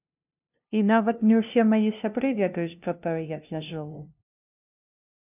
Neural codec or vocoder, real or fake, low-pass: codec, 16 kHz, 0.5 kbps, FunCodec, trained on LibriTTS, 25 frames a second; fake; 3.6 kHz